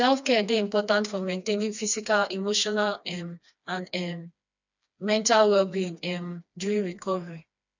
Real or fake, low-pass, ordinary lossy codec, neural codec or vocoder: fake; 7.2 kHz; none; codec, 16 kHz, 2 kbps, FreqCodec, smaller model